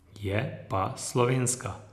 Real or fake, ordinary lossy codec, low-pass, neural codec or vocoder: real; none; 14.4 kHz; none